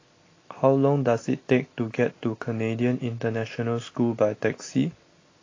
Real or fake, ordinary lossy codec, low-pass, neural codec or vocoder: real; AAC, 32 kbps; 7.2 kHz; none